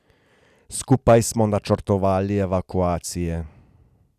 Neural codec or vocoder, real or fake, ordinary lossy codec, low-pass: none; real; none; 14.4 kHz